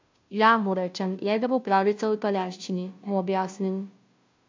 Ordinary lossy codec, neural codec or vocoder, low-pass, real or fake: MP3, 48 kbps; codec, 16 kHz, 0.5 kbps, FunCodec, trained on Chinese and English, 25 frames a second; 7.2 kHz; fake